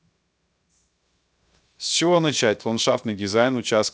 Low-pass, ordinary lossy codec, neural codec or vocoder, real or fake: none; none; codec, 16 kHz, 0.7 kbps, FocalCodec; fake